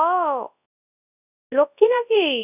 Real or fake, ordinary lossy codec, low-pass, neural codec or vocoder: fake; none; 3.6 kHz; codec, 24 kHz, 0.9 kbps, WavTokenizer, large speech release